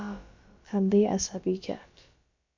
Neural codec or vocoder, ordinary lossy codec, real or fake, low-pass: codec, 16 kHz, about 1 kbps, DyCAST, with the encoder's durations; MP3, 48 kbps; fake; 7.2 kHz